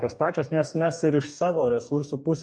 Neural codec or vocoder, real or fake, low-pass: codec, 44.1 kHz, 2.6 kbps, DAC; fake; 9.9 kHz